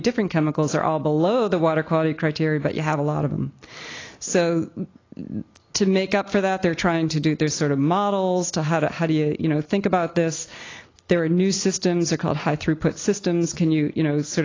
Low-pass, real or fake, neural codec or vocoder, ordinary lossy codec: 7.2 kHz; real; none; AAC, 32 kbps